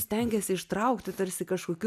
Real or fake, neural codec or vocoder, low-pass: real; none; 14.4 kHz